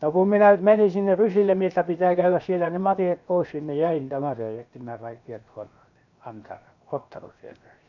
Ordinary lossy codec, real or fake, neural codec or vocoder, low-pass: none; fake; codec, 16 kHz, 0.7 kbps, FocalCodec; 7.2 kHz